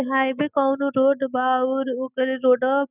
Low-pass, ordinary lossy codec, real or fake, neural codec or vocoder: 3.6 kHz; none; real; none